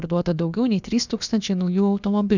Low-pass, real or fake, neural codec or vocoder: 7.2 kHz; fake; codec, 16 kHz, about 1 kbps, DyCAST, with the encoder's durations